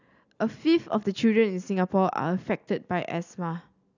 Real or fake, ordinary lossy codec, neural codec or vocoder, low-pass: real; none; none; 7.2 kHz